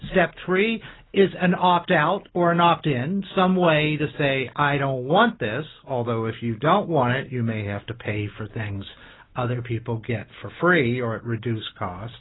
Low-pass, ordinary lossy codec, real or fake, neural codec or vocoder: 7.2 kHz; AAC, 16 kbps; real; none